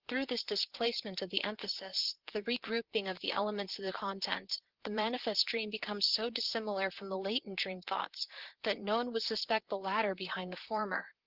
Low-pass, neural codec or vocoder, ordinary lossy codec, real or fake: 5.4 kHz; vocoder, 44.1 kHz, 128 mel bands, Pupu-Vocoder; Opus, 16 kbps; fake